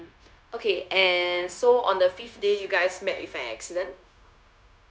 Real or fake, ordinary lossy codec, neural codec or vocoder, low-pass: fake; none; codec, 16 kHz, 0.9 kbps, LongCat-Audio-Codec; none